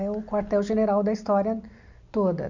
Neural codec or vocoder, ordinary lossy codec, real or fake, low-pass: none; none; real; 7.2 kHz